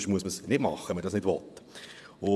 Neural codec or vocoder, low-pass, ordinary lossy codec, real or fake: vocoder, 24 kHz, 100 mel bands, Vocos; none; none; fake